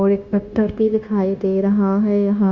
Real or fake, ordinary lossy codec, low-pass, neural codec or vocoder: fake; Opus, 64 kbps; 7.2 kHz; codec, 16 kHz, 0.9 kbps, LongCat-Audio-Codec